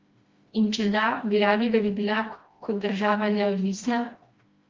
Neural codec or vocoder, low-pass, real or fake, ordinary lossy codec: codec, 16 kHz, 1 kbps, FreqCodec, smaller model; 7.2 kHz; fake; Opus, 32 kbps